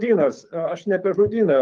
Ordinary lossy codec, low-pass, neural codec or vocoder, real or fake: Opus, 32 kbps; 9.9 kHz; codec, 24 kHz, 6 kbps, HILCodec; fake